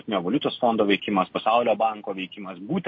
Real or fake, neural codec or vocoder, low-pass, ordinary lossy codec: real; none; 7.2 kHz; MP3, 32 kbps